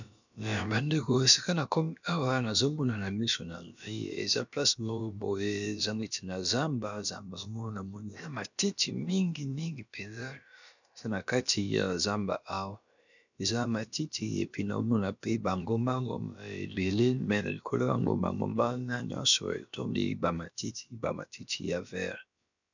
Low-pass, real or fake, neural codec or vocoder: 7.2 kHz; fake; codec, 16 kHz, about 1 kbps, DyCAST, with the encoder's durations